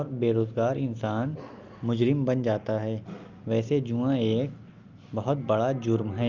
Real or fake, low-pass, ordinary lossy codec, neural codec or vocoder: real; 7.2 kHz; Opus, 32 kbps; none